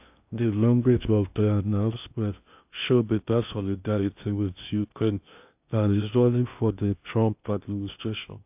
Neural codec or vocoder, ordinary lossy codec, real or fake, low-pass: codec, 16 kHz in and 24 kHz out, 0.6 kbps, FocalCodec, streaming, 2048 codes; AAC, 32 kbps; fake; 3.6 kHz